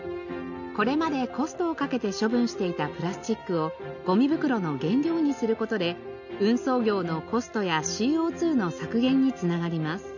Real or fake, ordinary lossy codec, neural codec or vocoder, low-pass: real; none; none; 7.2 kHz